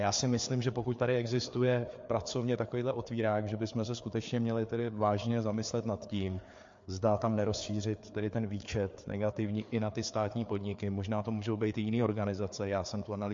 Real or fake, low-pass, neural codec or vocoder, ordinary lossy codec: fake; 7.2 kHz; codec, 16 kHz, 4 kbps, FreqCodec, larger model; MP3, 48 kbps